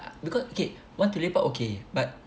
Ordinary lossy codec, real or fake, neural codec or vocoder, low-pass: none; real; none; none